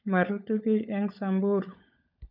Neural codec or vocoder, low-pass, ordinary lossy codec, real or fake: codec, 16 kHz, 16 kbps, FunCodec, trained on LibriTTS, 50 frames a second; 5.4 kHz; none; fake